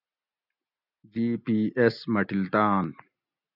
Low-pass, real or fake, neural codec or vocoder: 5.4 kHz; real; none